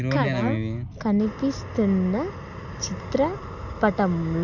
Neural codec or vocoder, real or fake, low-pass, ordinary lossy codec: none; real; 7.2 kHz; none